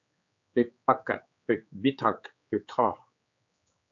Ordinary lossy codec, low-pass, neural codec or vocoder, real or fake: AAC, 64 kbps; 7.2 kHz; codec, 16 kHz, 4 kbps, X-Codec, HuBERT features, trained on general audio; fake